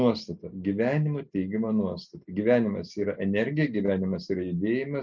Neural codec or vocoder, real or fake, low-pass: none; real; 7.2 kHz